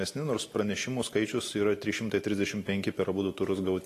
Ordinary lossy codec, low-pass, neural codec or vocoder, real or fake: AAC, 48 kbps; 14.4 kHz; none; real